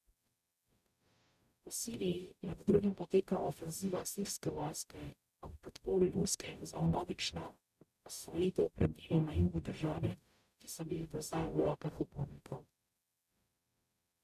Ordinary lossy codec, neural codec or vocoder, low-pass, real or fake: none; codec, 44.1 kHz, 0.9 kbps, DAC; 14.4 kHz; fake